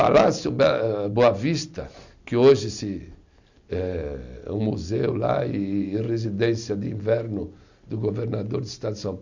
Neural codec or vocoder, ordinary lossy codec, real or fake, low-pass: none; none; real; 7.2 kHz